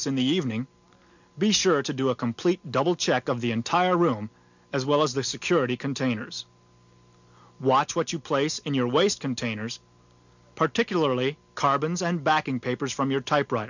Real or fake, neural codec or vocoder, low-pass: real; none; 7.2 kHz